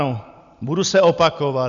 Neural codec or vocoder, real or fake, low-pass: none; real; 7.2 kHz